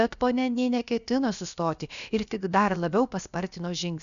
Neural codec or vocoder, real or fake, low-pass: codec, 16 kHz, about 1 kbps, DyCAST, with the encoder's durations; fake; 7.2 kHz